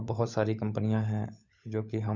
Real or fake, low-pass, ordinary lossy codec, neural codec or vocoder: fake; 7.2 kHz; none; codec, 16 kHz, 16 kbps, FunCodec, trained on LibriTTS, 50 frames a second